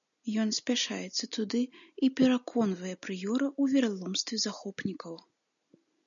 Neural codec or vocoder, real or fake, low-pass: none; real; 7.2 kHz